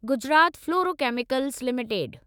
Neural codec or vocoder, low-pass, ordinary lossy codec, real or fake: autoencoder, 48 kHz, 128 numbers a frame, DAC-VAE, trained on Japanese speech; none; none; fake